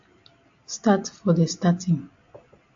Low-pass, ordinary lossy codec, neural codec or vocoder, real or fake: 7.2 kHz; AAC, 64 kbps; none; real